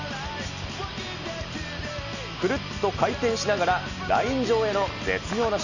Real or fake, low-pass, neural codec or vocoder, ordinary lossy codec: real; 7.2 kHz; none; none